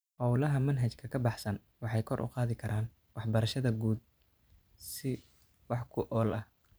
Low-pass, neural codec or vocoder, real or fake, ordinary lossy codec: none; vocoder, 44.1 kHz, 128 mel bands every 512 samples, BigVGAN v2; fake; none